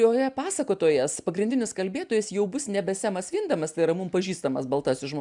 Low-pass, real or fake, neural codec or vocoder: 10.8 kHz; real; none